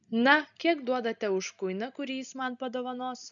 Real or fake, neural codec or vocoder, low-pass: real; none; 7.2 kHz